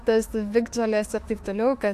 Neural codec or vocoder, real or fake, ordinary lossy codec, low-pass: autoencoder, 48 kHz, 32 numbers a frame, DAC-VAE, trained on Japanese speech; fake; AAC, 64 kbps; 14.4 kHz